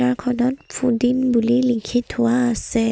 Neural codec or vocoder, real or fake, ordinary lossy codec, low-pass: none; real; none; none